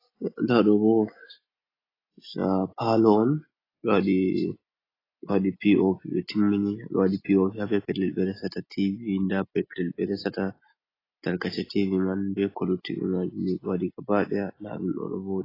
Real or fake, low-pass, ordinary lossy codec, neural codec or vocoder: real; 5.4 kHz; AAC, 24 kbps; none